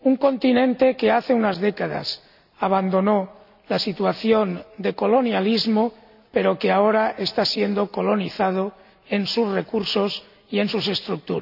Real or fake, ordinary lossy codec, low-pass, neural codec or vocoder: real; none; 5.4 kHz; none